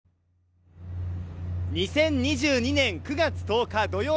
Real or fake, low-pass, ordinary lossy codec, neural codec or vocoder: real; none; none; none